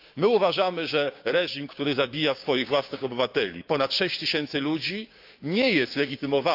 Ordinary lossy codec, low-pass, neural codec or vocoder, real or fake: AAC, 48 kbps; 5.4 kHz; codec, 16 kHz, 6 kbps, DAC; fake